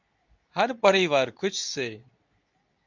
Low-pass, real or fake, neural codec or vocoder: 7.2 kHz; fake; codec, 24 kHz, 0.9 kbps, WavTokenizer, medium speech release version 2